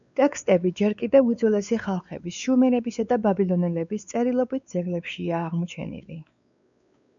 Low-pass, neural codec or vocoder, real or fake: 7.2 kHz; codec, 16 kHz, 4 kbps, X-Codec, WavLM features, trained on Multilingual LibriSpeech; fake